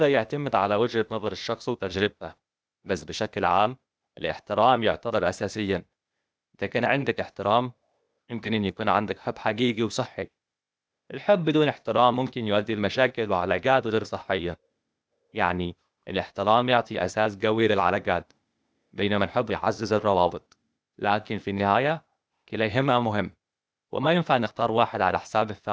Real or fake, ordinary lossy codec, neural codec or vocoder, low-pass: fake; none; codec, 16 kHz, 0.8 kbps, ZipCodec; none